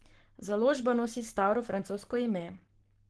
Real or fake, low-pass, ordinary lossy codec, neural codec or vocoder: fake; 10.8 kHz; Opus, 16 kbps; codec, 44.1 kHz, 7.8 kbps, Pupu-Codec